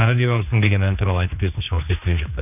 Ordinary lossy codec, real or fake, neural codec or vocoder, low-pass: none; fake; codec, 16 kHz, 2 kbps, X-Codec, HuBERT features, trained on general audio; 3.6 kHz